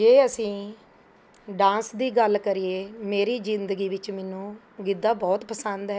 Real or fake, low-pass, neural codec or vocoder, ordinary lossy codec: real; none; none; none